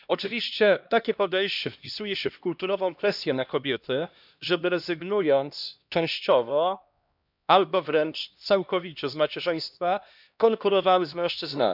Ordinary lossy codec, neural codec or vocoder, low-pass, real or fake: none; codec, 16 kHz, 1 kbps, X-Codec, HuBERT features, trained on LibriSpeech; 5.4 kHz; fake